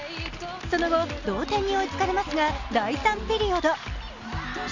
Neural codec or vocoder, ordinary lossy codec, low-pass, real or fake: none; Opus, 64 kbps; 7.2 kHz; real